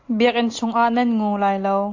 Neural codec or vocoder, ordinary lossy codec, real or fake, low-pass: none; AAC, 48 kbps; real; 7.2 kHz